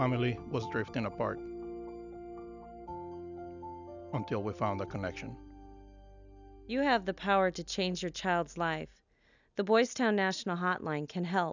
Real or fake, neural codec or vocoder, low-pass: real; none; 7.2 kHz